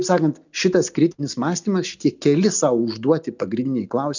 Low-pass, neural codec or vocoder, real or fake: 7.2 kHz; none; real